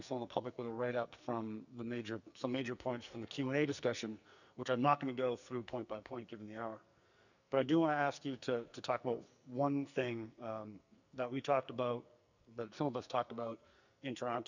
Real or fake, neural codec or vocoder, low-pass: fake; codec, 44.1 kHz, 2.6 kbps, SNAC; 7.2 kHz